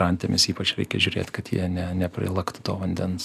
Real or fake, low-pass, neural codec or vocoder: real; 14.4 kHz; none